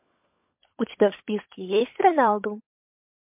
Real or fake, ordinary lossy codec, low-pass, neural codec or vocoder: fake; MP3, 32 kbps; 3.6 kHz; codec, 16 kHz, 16 kbps, FunCodec, trained on LibriTTS, 50 frames a second